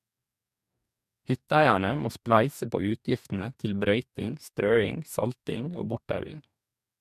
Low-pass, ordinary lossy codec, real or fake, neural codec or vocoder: 14.4 kHz; MP3, 96 kbps; fake; codec, 44.1 kHz, 2.6 kbps, DAC